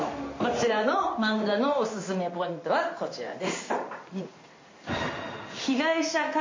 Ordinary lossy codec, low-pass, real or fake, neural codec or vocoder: MP3, 32 kbps; 7.2 kHz; fake; codec, 16 kHz in and 24 kHz out, 1 kbps, XY-Tokenizer